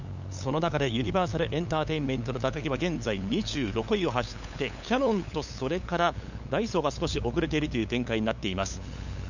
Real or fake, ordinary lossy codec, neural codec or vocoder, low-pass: fake; none; codec, 16 kHz, 8 kbps, FunCodec, trained on LibriTTS, 25 frames a second; 7.2 kHz